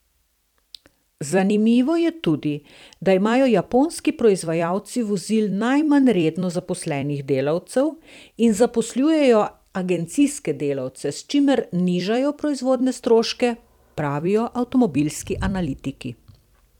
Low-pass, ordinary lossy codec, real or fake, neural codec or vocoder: 19.8 kHz; none; fake; vocoder, 44.1 kHz, 128 mel bands every 256 samples, BigVGAN v2